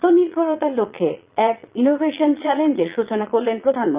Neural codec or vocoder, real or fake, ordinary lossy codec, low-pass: vocoder, 22.05 kHz, 80 mel bands, Vocos; fake; Opus, 32 kbps; 3.6 kHz